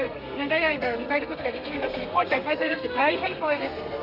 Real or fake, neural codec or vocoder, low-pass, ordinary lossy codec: fake; codec, 32 kHz, 1.9 kbps, SNAC; 5.4 kHz; none